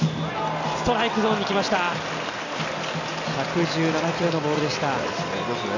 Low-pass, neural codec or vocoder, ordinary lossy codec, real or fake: 7.2 kHz; none; none; real